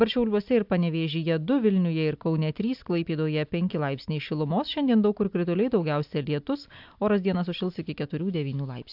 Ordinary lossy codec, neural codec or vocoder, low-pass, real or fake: AAC, 48 kbps; none; 5.4 kHz; real